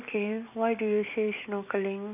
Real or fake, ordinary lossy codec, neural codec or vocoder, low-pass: fake; none; vocoder, 44.1 kHz, 128 mel bands every 256 samples, BigVGAN v2; 3.6 kHz